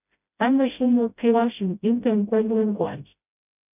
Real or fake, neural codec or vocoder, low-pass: fake; codec, 16 kHz, 0.5 kbps, FreqCodec, smaller model; 3.6 kHz